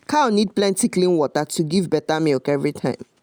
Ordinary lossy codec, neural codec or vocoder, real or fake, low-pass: none; none; real; none